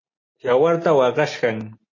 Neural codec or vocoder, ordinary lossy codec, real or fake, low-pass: none; MP3, 32 kbps; real; 7.2 kHz